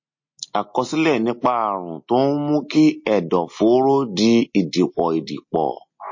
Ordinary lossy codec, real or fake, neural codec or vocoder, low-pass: MP3, 32 kbps; real; none; 7.2 kHz